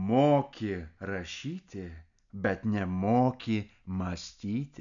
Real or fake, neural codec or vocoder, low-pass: real; none; 7.2 kHz